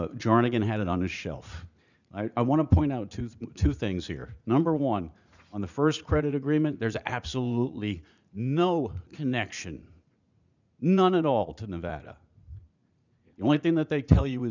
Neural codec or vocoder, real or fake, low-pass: vocoder, 44.1 kHz, 80 mel bands, Vocos; fake; 7.2 kHz